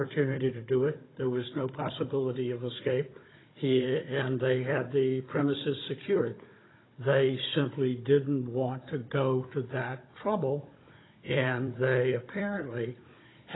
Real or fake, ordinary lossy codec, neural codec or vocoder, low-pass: fake; AAC, 16 kbps; codec, 16 kHz, 16 kbps, FunCodec, trained on Chinese and English, 50 frames a second; 7.2 kHz